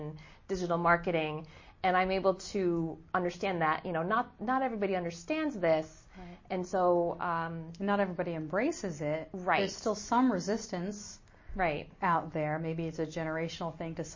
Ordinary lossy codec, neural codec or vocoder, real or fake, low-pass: MP3, 32 kbps; none; real; 7.2 kHz